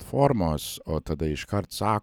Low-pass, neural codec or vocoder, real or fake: 19.8 kHz; none; real